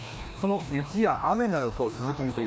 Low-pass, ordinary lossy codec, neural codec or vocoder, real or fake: none; none; codec, 16 kHz, 1 kbps, FreqCodec, larger model; fake